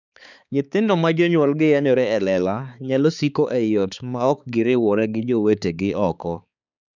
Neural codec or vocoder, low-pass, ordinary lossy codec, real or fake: codec, 16 kHz, 4 kbps, X-Codec, HuBERT features, trained on balanced general audio; 7.2 kHz; none; fake